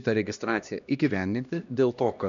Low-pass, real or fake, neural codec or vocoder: 7.2 kHz; fake; codec, 16 kHz, 1 kbps, X-Codec, HuBERT features, trained on LibriSpeech